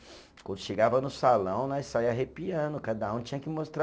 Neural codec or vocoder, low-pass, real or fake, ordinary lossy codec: none; none; real; none